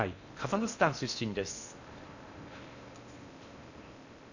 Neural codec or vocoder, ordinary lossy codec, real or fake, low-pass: codec, 16 kHz in and 24 kHz out, 0.8 kbps, FocalCodec, streaming, 65536 codes; none; fake; 7.2 kHz